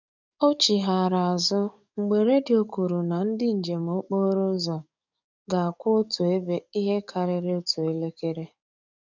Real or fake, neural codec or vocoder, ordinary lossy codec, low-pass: fake; codec, 44.1 kHz, 7.8 kbps, DAC; none; 7.2 kHz